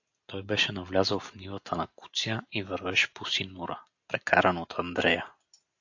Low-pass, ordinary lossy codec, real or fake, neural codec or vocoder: 7.2 kHz; AAC, 48 kbps; real; none